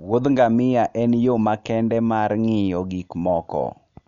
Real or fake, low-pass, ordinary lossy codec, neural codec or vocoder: real; 7.2 kHz; none; none